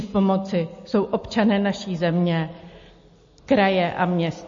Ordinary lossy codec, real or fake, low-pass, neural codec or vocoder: MP3, 32 kbps; real; 7.2 kHz; none